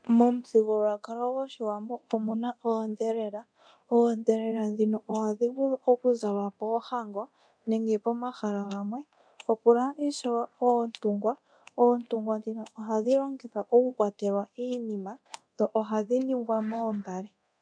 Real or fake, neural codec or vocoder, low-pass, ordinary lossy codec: fake; codec, 24 kHz, 0.9 kbps, DualCodec; 9.9 kHz; MP3, 64 kbps